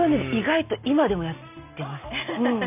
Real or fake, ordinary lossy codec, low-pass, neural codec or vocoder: real; none; 3.6 kHz; none